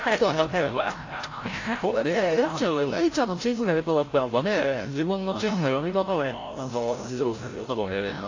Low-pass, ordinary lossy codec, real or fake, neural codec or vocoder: 7.2 kHz; AAC, 32 kbps; fake; codec, 16 kHz, 0.5 kbps, FreqCodec, larger model